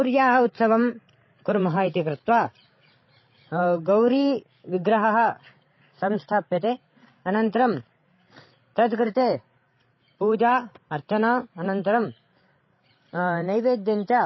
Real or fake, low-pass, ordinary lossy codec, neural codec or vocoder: fake; 7.2 kHz; MP3, 24 kbps; codec, 16 kHz, 16 kbps, FreqCodec, larger model